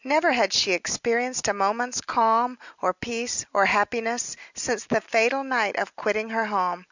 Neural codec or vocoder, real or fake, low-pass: none; real; 7.2 kHz